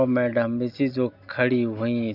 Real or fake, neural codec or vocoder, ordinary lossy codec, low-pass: real; none; none; 5.4 kHz